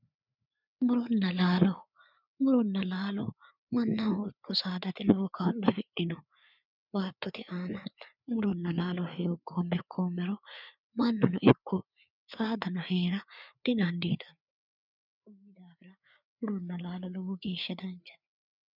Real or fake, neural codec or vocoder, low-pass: fake; codec, 16 kHz, 4 kbps, FreqCodec, larger model; 5.4 kHz